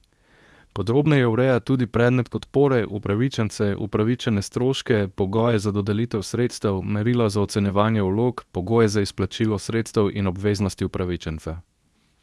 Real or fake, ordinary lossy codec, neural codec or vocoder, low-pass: fake; none; codec, 24 kHz, 0.9 kbps, WavTokenizer, medium speech release version 2; none